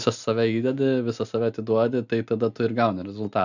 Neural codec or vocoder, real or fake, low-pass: none; real; 7.2 kHz